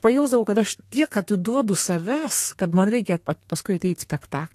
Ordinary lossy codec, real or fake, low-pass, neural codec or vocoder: AAC, 64 kbps; fake; 14.4 kHz; codec, 32 kHz, 1.9 kbps, SNAC